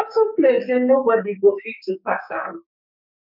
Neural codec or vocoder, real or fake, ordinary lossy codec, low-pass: codec, 44.1 kHz, 2.6 kbps, SNAC; fake; none; 5.4 kHz